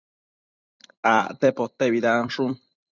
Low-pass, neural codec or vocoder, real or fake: 7.2 kHz; vocoder, 44.1 kHz, 128 mel bands every 256 samples, BigVGAN v2; fake